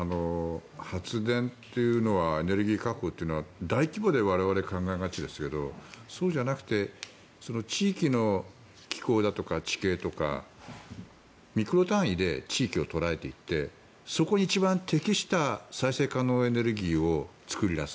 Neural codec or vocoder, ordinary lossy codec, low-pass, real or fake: none; none; none; real